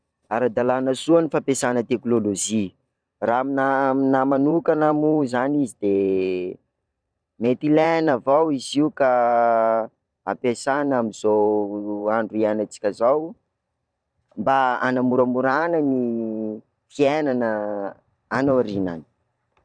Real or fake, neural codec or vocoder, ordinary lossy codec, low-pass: fake; vocoder, 44.1 kHz, 128 mel bands every 512 samples, BigVGAN v2; none; 9.9 kHz